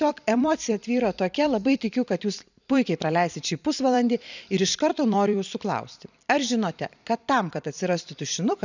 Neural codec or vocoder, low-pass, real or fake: vocoder, 44.1 kHz, 128 mel bands every 256 samples, BigVGAN v2; 7.2 kHz; fake